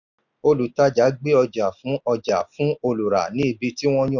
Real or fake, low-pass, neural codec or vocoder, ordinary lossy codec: real; 7.2 kHz; none; none